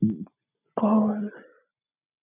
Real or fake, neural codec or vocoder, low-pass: real; none; 3.6 kHz